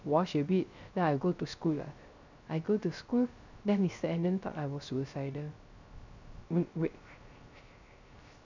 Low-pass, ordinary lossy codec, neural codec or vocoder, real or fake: 7.2 kHz; none; codec, 16 kHz, 0.3 kbps, FocalCodec; fake